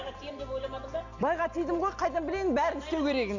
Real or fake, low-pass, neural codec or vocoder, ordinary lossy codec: real; 7.2 kHz; none; none